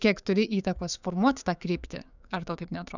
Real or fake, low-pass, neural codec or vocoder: fake; 7.2 kHz; codec, 16 kHz, 6 kbps, DAC